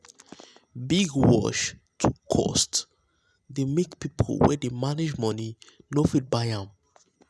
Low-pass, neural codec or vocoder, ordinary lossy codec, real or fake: none; none; none; real